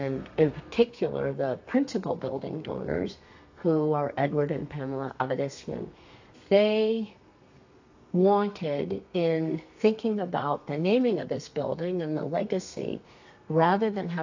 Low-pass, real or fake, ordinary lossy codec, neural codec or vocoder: 7.2 kHz; fake; AAC, 48 kbps; codec, 32 kHz, 1.9 kbps, SNAC